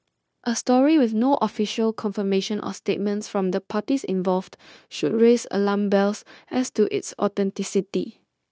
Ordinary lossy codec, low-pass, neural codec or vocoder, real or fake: none; none; codec, 16 kHz, 0.9 kbps, LongCat-Audio-Codec; fake